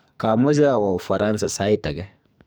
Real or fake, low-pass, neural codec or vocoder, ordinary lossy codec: fake; none; codec, 44.1 kHz, 2.6 kbps, SNAC; none